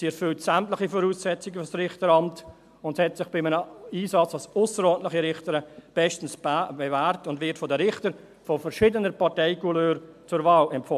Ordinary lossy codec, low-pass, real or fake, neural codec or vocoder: MP3, 96 kbps; 14.4 kHz; real; none